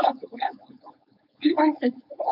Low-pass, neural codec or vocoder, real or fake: 5.4 kHz; codec, 16 kHz, 4.8 kbps, FACodec; fake